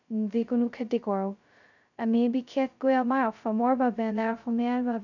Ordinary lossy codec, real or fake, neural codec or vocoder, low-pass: none; fake; codec, 16 kHz, 0.2 kbps, FocalCodec; 7.2 kHz